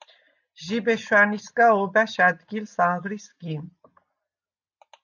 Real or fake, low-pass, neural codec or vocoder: real; 7.2 kHz; none